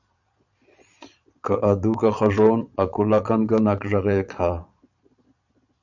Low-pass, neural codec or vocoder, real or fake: 7.2 kHz; vocoder, 22.05 kHz, 80 mel bands, Vocos; fake